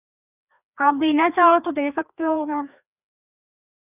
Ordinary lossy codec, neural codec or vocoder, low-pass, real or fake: none; codec, 16 kHz, 2 kbps, FreqCodec, larger model; 3.6 kHz; fake